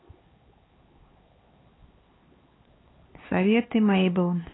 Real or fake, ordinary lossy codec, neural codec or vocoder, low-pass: fake; AAC, 16 kbps; codec, 16 kHz, 4 kbps, X-Codec, HuBERT features, trained on LibriSpeech; 7.2 kHz